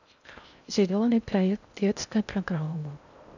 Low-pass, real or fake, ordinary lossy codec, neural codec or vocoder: 7.2 kHz; fake; none; codec, 16 kHz in and 24 kHz out, 0.6 kbps, FocalCodec, streaming, 2048 codes